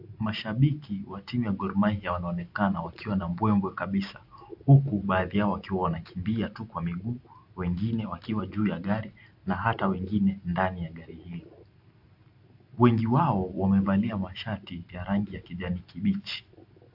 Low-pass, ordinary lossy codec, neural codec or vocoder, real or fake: 5.4 kHz; AAC, 48 kbps; none; real